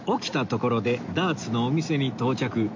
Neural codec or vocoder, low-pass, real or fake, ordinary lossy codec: none; 7.2 kHz; real; MP3, 48 kbps